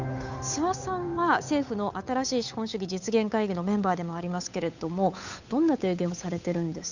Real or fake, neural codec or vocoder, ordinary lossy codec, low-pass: fake; codec, 16 kHz, 8 kbps, FunCodec, trained on Chinese and English, 25 frames a second; none; 7.2 kHz